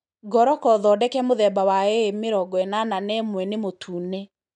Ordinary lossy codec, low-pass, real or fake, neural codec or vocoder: MP3, 96 kbps; 10.8 kHz; real; none